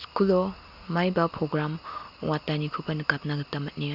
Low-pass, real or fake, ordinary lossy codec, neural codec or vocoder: 5.4 kHz; real; Opus, 64 kbps; none